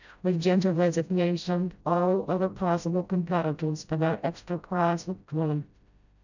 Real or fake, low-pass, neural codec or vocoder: fake; 7.2 kHz; codec, 16 kHz, 0.5 kbps, FreqCodec, smaller model